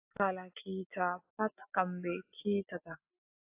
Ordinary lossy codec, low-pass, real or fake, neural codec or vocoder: AAC, 24 kbps; 3.6 kHz; real; none